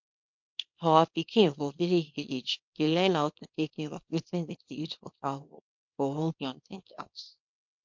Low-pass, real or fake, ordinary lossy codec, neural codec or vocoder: 7.2 kHz; fake; MP3, 48 kbps; codec, 24 kHz, 0.9 kbps, WavTokenizer, small release